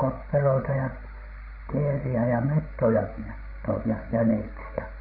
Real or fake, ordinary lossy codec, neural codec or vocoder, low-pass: fake; none; codec, 16 kHz, 16 kbps, FreqCodec, larger model; 5.4 kHz